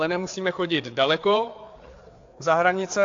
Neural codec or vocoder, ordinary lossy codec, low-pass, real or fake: codec, 16 kHz, 4 kbps, FreqCodec, larger model; AAC, 48 kbps; 7.2 kHz; fake